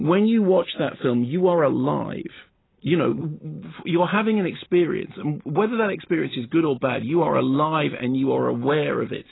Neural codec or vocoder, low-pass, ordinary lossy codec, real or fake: none; 7.2 kHz; AAC, 16 kbps; real